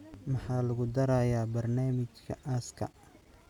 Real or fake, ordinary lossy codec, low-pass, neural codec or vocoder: real; none; 19.8 kHz; none